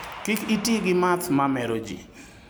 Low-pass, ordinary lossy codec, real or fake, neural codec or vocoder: none; none; real; none